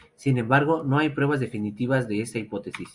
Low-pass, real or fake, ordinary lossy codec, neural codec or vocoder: 10.8 kHz; real; Opus, 64 kbps; none